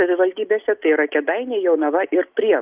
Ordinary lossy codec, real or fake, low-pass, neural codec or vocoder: Opus, 32 kbps; real; 3.6 kHz; none